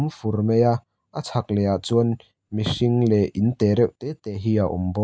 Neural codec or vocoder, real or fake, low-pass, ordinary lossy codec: none; real; none; none